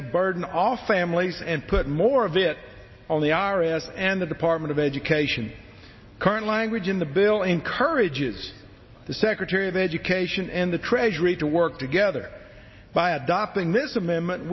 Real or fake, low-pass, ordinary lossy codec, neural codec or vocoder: real; 7.2 kHz; MP3, 24 kbps; none